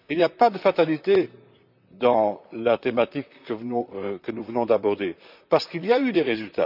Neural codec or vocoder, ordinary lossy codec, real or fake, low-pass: vocoder, 44.1 kHz, 128 mel bands, Pupu-Vocoder; none; fake; 5.4 kHz